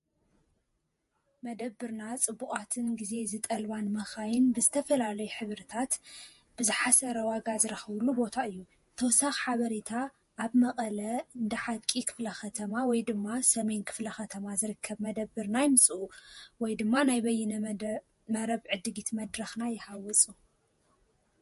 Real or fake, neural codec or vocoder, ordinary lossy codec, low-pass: fake; vocoder, 48 kHz, 128 mel bands, Vocos; MP3, 48 kbps; 14.4 kHz